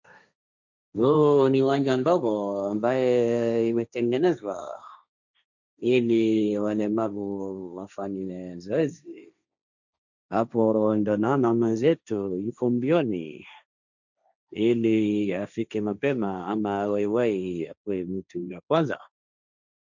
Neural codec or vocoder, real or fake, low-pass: codec, 16 kHz, 1.1 kbps, Voila-Tokenizer; fake; 7.2 kHz